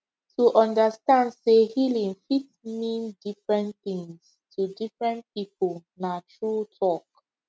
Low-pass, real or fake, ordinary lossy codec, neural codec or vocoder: none; real; none; none